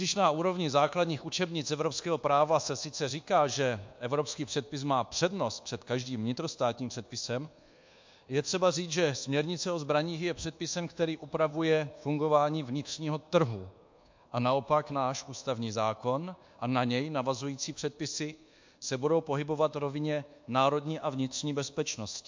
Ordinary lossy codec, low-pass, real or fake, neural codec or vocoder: MP3, 48 kbps; 7.2 kHz; fake; codec, 24 kHz, 1.2 kbps, DualCodec